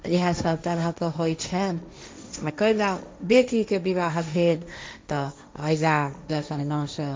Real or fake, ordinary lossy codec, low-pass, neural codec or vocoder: fake; none; none; codec, 16 kHz, 1.1 kbps, Voila-Tokenizer